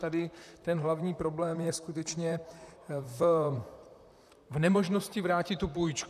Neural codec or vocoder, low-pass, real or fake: vocoder, 44.1 kHz, 128 mel bands, Pupu-Vocoder; 14.4 kHz; fake